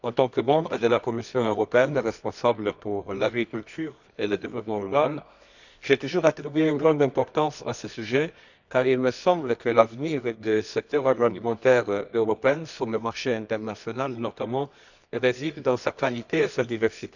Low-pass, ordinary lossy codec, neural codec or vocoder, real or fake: 7.2 kHz; none; codec, 24 kHz, 0.9 kbps, WavTokenizer, medium music audio release; fake